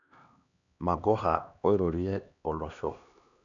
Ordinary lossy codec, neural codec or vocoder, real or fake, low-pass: none; codec, 16 kHz, 2 kbps, X-Codec, HuBERT features, trained on LibriSpeech; fake; 7.2 kHz